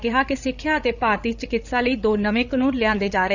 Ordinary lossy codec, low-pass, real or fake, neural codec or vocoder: none; 7.2 kHz; fake; codec, 16 kHz, 16 kbps, FreqCodec, larger model